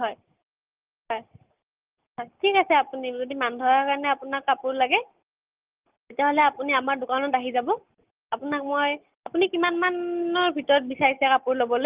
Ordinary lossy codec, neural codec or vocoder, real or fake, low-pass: Opus, 32 kbps; none; real; 3.6 kHz